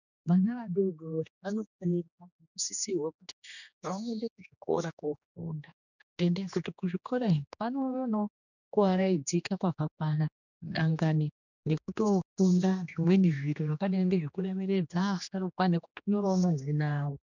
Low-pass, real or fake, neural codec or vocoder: 7.2 kHz; fake; codec, 16 kHz, 2 kbps, X-Codec, HuBERT features, trained on general audio